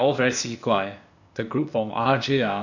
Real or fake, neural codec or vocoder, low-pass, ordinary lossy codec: fake; codec, 16 kHz, 0.8 kbps, ZipCodec; 7.2 kHz; none